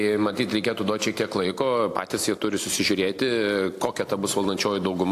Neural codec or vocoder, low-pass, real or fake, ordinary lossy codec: none; 14.4 kHz; real; AAC, 64 kbps